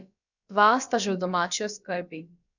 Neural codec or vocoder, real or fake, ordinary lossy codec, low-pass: codec, 16 kHz, about 1 kbps, DyCAST, with the encoder's durations; fake; none; 7.2 kHz